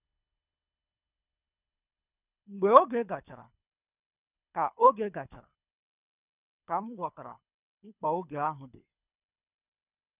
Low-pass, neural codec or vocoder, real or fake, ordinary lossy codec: 3.6 kHz; codec, 24 kHz, 3 kbps, HILCodec; fake; none